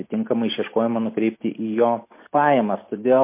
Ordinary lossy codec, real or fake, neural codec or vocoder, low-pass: MP3, 24 kbps; real; none; 3.6 kHz